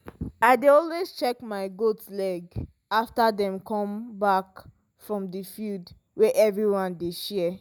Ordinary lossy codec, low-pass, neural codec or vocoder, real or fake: none; none; none; real